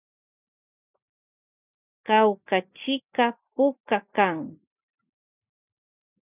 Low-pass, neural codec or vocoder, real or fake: 3.6 kHz; none; real